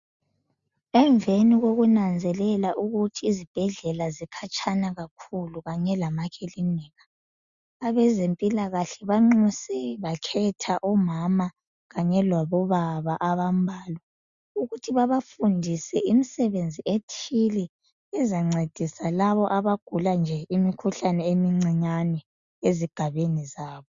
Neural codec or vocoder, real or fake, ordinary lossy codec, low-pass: none; real; Opus, 64 kbps; 7.2 kHz